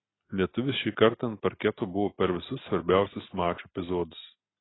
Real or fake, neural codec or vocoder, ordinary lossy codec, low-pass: real; none; AAC, 16 kbps; 7.2 kHz